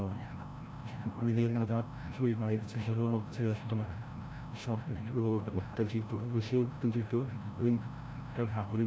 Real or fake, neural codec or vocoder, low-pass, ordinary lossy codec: fake; codec, 16 kHz, 0.5 kbps, FreqCodec, larger model; none; none